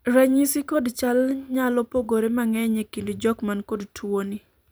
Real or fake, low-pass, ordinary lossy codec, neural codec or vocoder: fake; none; none; vocoder, 44.1 kHz, 128 mel bands every 256 samples, BigVGAN v2